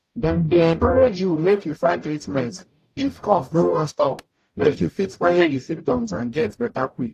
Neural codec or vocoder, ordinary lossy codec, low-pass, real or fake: codec, 44.1 kHz, 0.9 kbps, DAC; AAC, 64 kbps; 14.4 kHz; fake